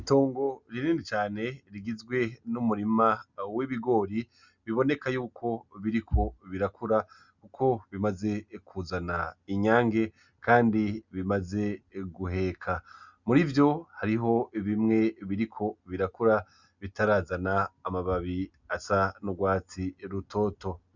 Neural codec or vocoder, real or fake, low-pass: none; real; 7.2 kHz